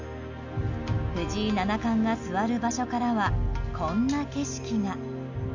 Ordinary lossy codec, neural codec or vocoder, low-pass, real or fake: none; none; 7.2 kHz; real